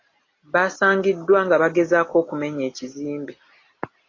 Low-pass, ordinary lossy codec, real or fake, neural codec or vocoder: 7.2 kHz; AAC, 32 kbps; real; none